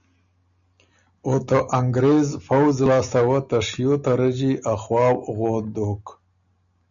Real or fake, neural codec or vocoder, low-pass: real; none; 7.2 kHz